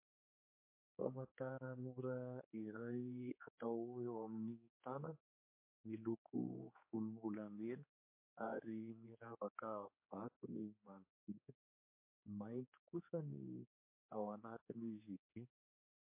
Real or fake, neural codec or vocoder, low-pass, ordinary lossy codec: fake; codec, 16 kHz, 4 kbps, X-Codec, HuBERT features, trained on general audio; 3.6 kHz; MP3, 24 kbps